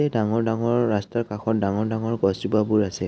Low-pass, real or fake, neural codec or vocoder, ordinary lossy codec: none; real; none; none